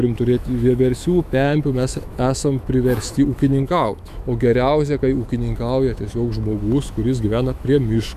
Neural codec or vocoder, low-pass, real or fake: autoencoder, 48 kHz, 128 numbers a frame, DAC-VAE, trained on Japanese speech; 14.4 kHz; fake